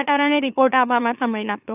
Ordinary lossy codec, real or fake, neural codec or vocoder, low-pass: none; fake; autoencoder, 44.1 kHz, a latent of 192 numbers a frame, MeloTTS; 3.6 kHz